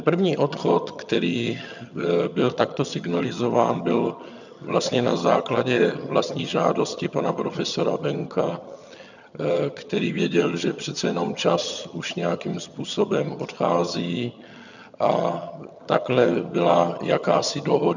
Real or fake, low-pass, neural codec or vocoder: fake; 7.2 kHz; vocoder, 22.05 kHz, 80 mel bands, HiFi-GAN